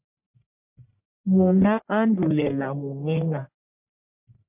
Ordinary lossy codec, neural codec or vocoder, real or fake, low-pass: MP3, 32 kbps; codec, 44.1 kHz, 1.7 kbps, Pupu-Codec; fake; 3.6 kHz